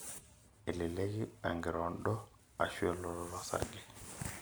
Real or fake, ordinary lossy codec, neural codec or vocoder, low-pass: real; none; none; none